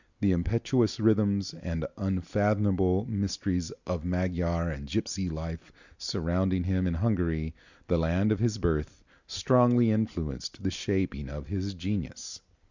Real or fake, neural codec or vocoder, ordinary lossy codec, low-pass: real; none; Opus, 64 kbps; 7.2 kHz